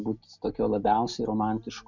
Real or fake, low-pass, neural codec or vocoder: real; 7.2 kHz; none